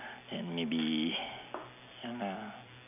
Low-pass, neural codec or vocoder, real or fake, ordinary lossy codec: 3.6 kHz; none; real; none